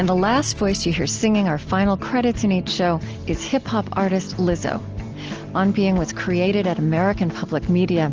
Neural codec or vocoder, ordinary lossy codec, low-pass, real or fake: none; Opus, 16 kbps; 7.2 kHz; real